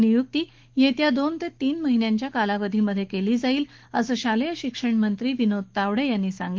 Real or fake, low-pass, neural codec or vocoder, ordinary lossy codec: fake; 7.2 kHz; autoencoder, 48 kHz, 128 numbers a frame, DAC-VAE, trained on Japanese speech; Opus, 16 kbps